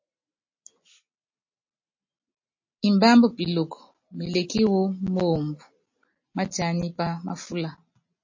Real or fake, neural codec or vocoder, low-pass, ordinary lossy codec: real; none; 7.2 kHz; MP3, 32 kbps